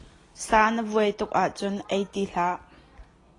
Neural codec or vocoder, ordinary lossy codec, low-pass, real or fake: none; AAC, 32 kbps; 10.8 kHz; real